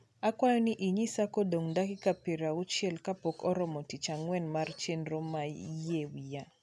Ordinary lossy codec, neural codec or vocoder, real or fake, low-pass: none; none; real; none